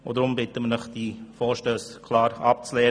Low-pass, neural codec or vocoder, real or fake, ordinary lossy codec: 9.9 kHz; none; real; none